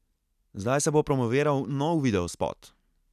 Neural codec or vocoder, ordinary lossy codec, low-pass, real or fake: none; none; 14.4 kHz; real